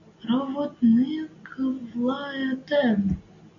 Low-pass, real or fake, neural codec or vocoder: 7.2 kHz; real; none